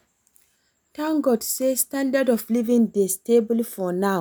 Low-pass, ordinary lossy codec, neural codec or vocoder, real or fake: none; none; none; real